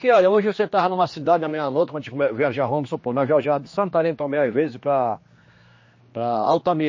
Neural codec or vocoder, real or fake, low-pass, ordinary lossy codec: codec, 16 kHz, 2 kbps, X-Codec, HuBERT features, trained on general audio; fake; 7.2 kHz; MP3, 32 kbps